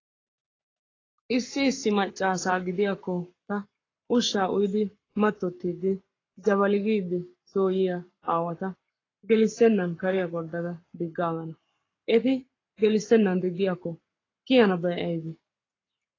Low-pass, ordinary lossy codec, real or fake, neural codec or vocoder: 7.2 kHz; AAC, 32 kbps; fake; codec, 44.1 kHz, 7.8 kbps, Pupu-Codec